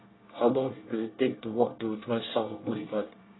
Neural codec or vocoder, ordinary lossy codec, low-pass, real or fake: codec, 24 kHz, 1 kbps, SNAC; AAC, 16 kbps; 7.2 kHz; fake